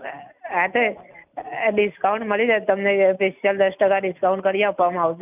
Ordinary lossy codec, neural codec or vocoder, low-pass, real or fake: none; none; 3.6 kHz; real